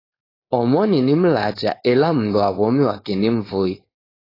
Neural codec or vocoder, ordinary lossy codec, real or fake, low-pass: codec, 16 kHz, 4.8 kbps, FACodec; AAC, 24 kbps; fake; 5.4 kHz